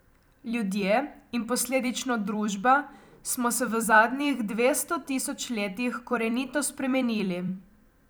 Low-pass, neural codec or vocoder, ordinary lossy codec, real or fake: none; vocoder, 44.1 kHz, 128 mel bands every 256 samples, BigVGAN v2; none; fake